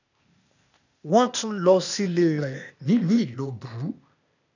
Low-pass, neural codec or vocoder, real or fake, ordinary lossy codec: 7.2 kHz; codec, 16 kHz, 0.8 kbps, ZipCodec; fake; none